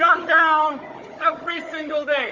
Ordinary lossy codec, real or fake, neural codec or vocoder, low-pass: Opus, 32 kbps; fake; codec, 16 kHz, 16 kbps, FunCodec, trained on Chinese and English, 50 frames a second; 7.2 kHz